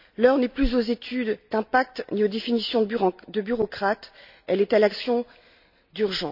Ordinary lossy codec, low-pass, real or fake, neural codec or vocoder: none; 5.4 kHz; real; none